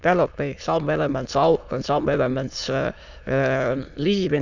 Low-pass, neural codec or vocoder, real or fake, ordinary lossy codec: 7.2 kHz; autoencoder, 22.05 kHz, a latent of 192 numbers a frame, VITS, trained on many speakers; fake; none